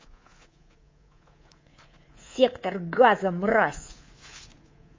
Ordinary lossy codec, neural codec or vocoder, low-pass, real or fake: MP3, 32 kbps; codec, 24 kHz, 3.1 kbps, DualCodec; 7.2 kHz; fake